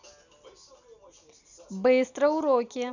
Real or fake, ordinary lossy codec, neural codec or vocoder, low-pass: real; none; none; 7.2 kHz